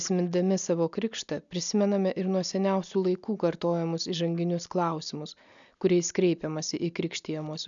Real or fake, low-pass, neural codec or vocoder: real; 7.2 kHz; none